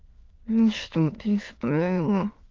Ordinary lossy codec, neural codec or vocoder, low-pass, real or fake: Opus, 24 kbps; autoencoder, 22.05 kHz, a latent of 192 numbers a frame, VITS, trained on many speakers; 7.2 kHz; fake